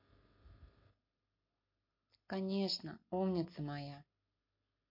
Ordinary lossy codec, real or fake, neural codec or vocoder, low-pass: MP3, 32 kbps; real; none; 5.4 kHz